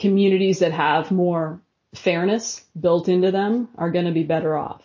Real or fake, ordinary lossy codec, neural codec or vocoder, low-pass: real; MP3, 32 kbps; none; 7.2 kHz